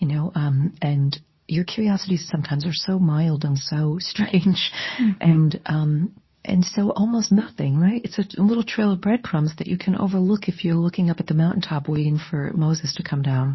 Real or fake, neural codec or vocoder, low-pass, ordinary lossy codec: fake; codec, 24 kHz, 0.9 kbps, WavTokenizer, medium speech release version 2; 7.2 kHz; MP3, 24 kbps